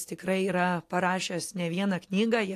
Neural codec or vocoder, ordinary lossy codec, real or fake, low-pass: none; AAC, 64 kbps; real; 14.4 kHz